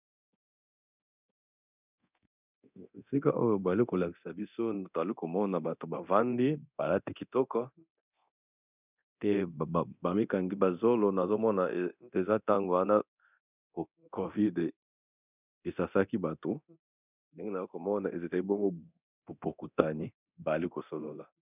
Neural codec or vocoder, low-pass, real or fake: codec, 24 kHz, 0.9 kbps, DualCodec; 3.6 kHz; fake